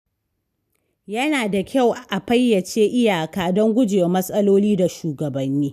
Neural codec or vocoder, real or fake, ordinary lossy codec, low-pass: none; real; none; 14.4 kHz